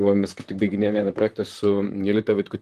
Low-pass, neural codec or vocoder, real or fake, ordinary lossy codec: 14.4 kHz; vocoder, 44.1 kHz, 128 mel bands, Pupu-Vocoder; fake; Opus, 24 kbps